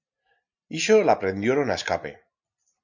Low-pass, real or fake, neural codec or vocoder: 7.2 kHz; real; none